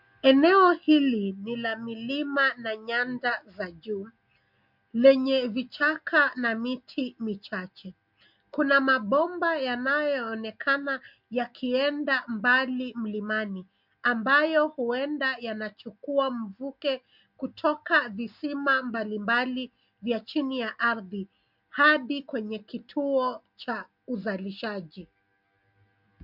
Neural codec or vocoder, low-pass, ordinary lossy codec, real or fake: none; 5.4 kHz; MP3, 48 kbps; real